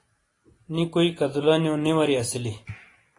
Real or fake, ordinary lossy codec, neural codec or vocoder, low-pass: real; AAC, 32 kbps; none; 10.8 kHz